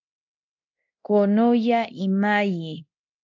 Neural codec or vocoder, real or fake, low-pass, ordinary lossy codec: codec, 24 kHz, 0.9 kbps, DualCodec; fake; 7.2 kHz; AAC, 48 kbps